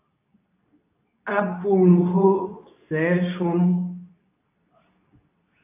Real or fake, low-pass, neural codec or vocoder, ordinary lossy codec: fake; 3.6 kHz; codec, 24 kHz, 0.9 kbps, WavTokenizer, medium speech release version 2; AAC, 32 kbps